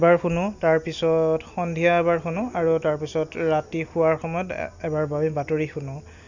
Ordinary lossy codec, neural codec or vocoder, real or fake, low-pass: Opus, 64 kbps; none; real; 7.2 kHz